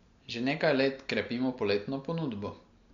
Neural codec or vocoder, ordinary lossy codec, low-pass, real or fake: none; MP3, 48 kbps; 7.2 kHz; real